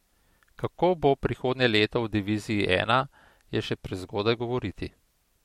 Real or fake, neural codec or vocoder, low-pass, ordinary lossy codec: real; none; 19.8 kHz; MP3, 64 kbps